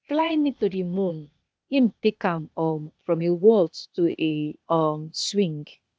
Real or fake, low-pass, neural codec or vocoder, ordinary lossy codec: fake; none; codec, 16 kHz, 0.8 kbps, ZipCodec; none